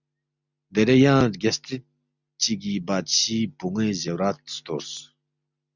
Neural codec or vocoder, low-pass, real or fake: none; 7.2 kHz; real